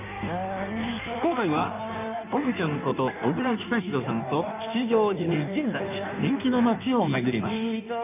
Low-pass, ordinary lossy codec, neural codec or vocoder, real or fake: 3.6 kHz; none; codec, 16 kHz in and 24 kHz out, 1.1 kbps, FireRedTTS-2 codec; fake